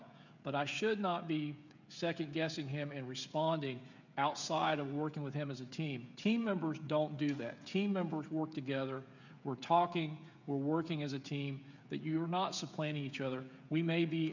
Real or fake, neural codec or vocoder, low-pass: fake; codec, 16 kHz, 16 kbps, FreqCodec, smaller model; 7.2 kHz